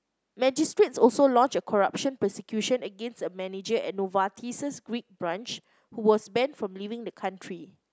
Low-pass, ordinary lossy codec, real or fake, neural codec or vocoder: none; none; real; none